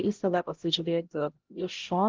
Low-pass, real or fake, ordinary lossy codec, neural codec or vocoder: 7.2 kHz; fake; Opus, 16 kbps; codec, 16 kHz, 0.5 kbps, X-Codec, HuBERT features, trained on LibriSpeech